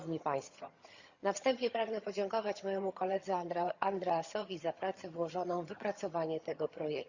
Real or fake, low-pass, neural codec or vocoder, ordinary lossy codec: fake; 7.2 kHz; vocoder, 22.05 kHz, 80 mel bands, HiFi-GAN; Opus, 64 kbps